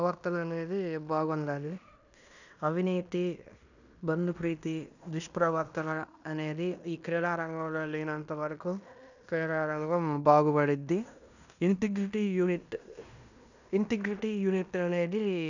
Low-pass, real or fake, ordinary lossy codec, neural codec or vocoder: 7.2 kHz; fake; none; codec, 16 kHz in and 24 kHz out, 0.9 kbps, LongCat-Audio-Codec, fine tuned four codebook decoder